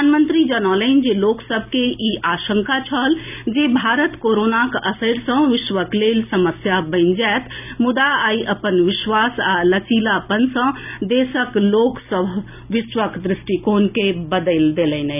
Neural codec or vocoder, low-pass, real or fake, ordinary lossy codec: none; 3.6 kHz; real; none